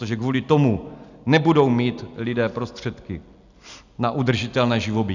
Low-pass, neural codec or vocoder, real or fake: 7.2 kHz; none; real